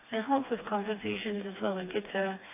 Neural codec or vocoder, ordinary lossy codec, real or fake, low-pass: codec, 16 kHz, 2 kbps, FreqCodec, smaller model; AAC, 24 kbps; fake; 3.6 kHz